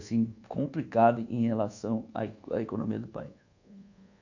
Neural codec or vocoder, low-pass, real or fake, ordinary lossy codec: codec, 24 kHz, 1.2 kbps, DualCodec; 7.2 kHz; fake; none